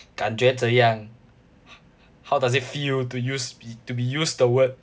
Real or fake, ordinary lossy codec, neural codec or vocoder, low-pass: real; none; none; none